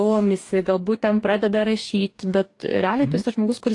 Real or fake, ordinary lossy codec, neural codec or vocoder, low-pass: fake; AAC, 48 kbps; codec, 44.1 kHz, 2.6 kbps, DAC; 10.8 kHz